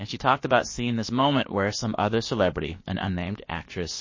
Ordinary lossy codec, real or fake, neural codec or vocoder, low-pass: MP3, 32 kbps; fake; codec, 16 kHz, about 1 kbps, DyCAST, with the encoder's durations; 7.2 kHz